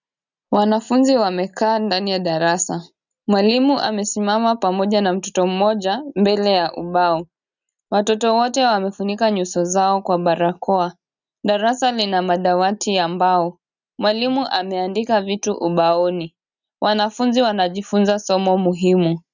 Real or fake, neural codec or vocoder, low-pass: real; none; 7.2 kHz